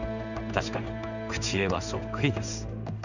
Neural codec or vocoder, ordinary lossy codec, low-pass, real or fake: codec, 16 kHz in and 24 kHz out, 1 kbps, XY-Tokenizer; none; 7.2 kHz; fake